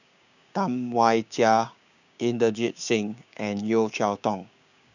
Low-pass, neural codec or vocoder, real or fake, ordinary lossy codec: 7.2 kHz; codec, 16 kHz, 6 kbps, DAC; fake; none